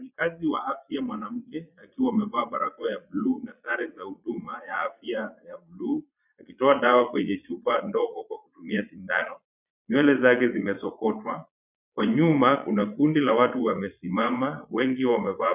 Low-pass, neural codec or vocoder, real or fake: 3.6 kHz; vocoder, 22.05 kHz, 80 mel bands, Vocos; fake